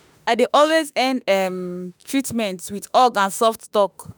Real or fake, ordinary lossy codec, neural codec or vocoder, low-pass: fake; none; autoencoder, 48 kHz, 32 numbers a frame, DAC-VAE, trained on Japanese speech; none